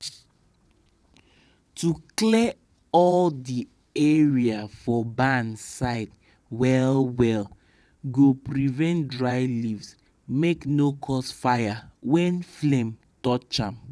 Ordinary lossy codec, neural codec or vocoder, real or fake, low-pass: none; vocoder, 22.05 kHz, 80 mel bands, WaveNeXt; fake; none